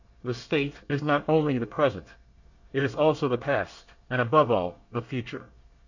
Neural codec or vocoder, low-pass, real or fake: codec, 24 kHz, 1 kbps, SNAC; 7.2 kHz; fake